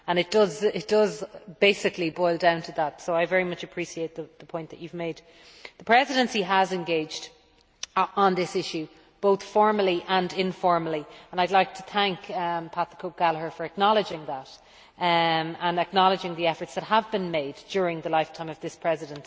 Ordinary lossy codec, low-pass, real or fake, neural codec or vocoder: none; none; real; none